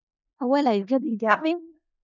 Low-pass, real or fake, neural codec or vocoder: 7.2 kHz; fake; codec, 16 kHz in and 24 kHz out, 0.4 kbps, LongCat-Audio-Codec, four codebook decoder